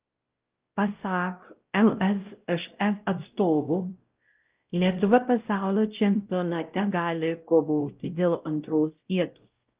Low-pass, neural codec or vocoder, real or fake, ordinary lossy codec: 3.6 kHz; codec, 16 kHz, 0.5 kbps, X-Codec, WavLM features, trained on Multilingual LibriSpeech; fake; Opus, 32 kbps